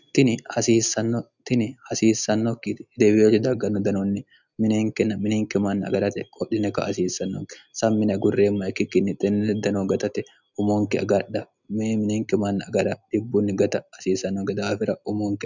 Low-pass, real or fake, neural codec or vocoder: 7.2 kHz; real; none